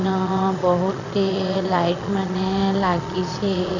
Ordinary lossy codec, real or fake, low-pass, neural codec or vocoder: none; fake; 7.2 kHz; vocoder, 22.05 kHz, 80 mel bands, Vocos